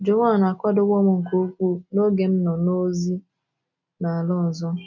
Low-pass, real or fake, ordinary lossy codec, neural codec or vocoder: 7.2 kHz; real; none; none